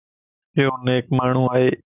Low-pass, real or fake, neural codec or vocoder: 3.6 kHz; real; none